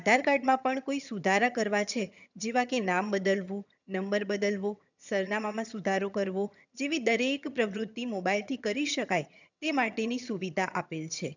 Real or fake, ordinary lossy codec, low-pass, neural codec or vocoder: fake; none; 7.2 kHz; vocoder, 22.05 kHz, 80 mel bands, HiFi-GAN